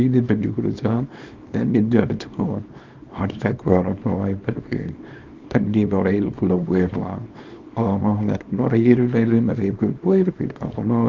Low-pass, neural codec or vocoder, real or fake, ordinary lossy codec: 7.2 kHz; codec, 24 kHz, 0.9 kbps, WavTokenizer, small release; fake; Opus, 24 kbps